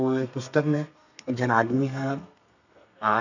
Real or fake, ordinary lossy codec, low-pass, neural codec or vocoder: fake; none; 7.2 kHz; codec, 32 kHz, 1.9 kbps, SNAC